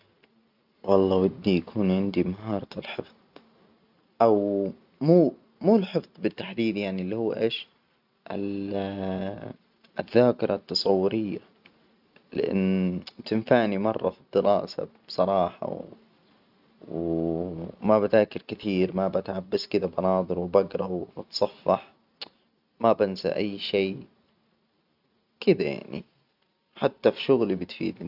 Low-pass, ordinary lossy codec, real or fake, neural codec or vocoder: 5.4 kHz; none; real; none